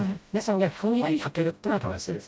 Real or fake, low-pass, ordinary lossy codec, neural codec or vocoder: fake; none; none; codec, 16 kHz, 0.5 kbps, FreqCodec, smaller model